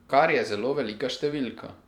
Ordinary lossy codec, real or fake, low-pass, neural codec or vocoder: none; fake; 19.8 kHz; vocoder, 44.1 kHz, 128 mel bands every 512 samples, BigVGAN v2